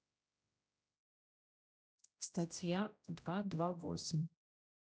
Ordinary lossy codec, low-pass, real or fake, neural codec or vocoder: none; none; fake; codec, 16 kHz, 0.5 kbps, X-Codec, HuBERT features, trained on general audio